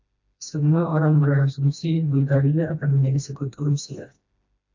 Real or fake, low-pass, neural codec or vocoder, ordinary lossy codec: fake; 7.2 kHz; codec, 16 kHz, 1 kbps, FreqCodec, smaller model; AAC, 48 kbps